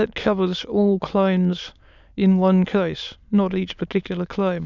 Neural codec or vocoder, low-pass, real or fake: autoencoder, 22.05 kHz, a latent of 192 numbers a frame, VITS, trained on many speakers; 7.2 kHz; fake